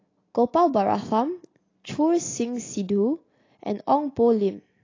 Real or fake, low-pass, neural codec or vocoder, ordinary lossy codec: real; 7.2 kHz; none; AAC, 32 kbps